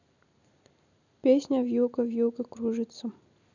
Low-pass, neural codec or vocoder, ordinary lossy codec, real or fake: 7.2 kHz; none; none; real